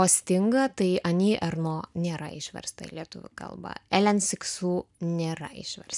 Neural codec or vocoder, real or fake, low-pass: none; real; 10.8 kHz